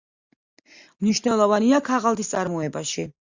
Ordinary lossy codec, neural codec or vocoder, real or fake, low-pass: Opus, 64 kbps; none; real; 7.2 kHz